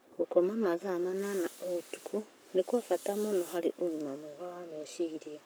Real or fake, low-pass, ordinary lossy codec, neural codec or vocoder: fake; none; none; codec, 44.1 kHz, 7.8 kbps, Pupu-Codec